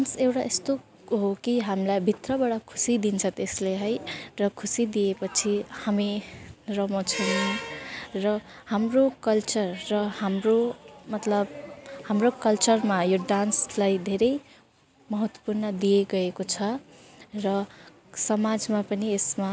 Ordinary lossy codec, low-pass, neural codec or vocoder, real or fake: none; none; none; real